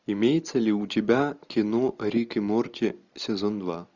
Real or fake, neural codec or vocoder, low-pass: real; none; 7.2 kHz